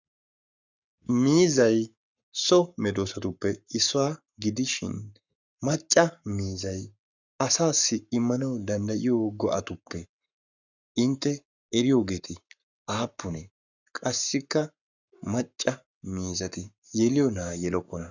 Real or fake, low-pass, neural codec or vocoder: fake; 7.2 kHz; codec, 44.1 kHz, 7.8 kbps, Pupu-Codec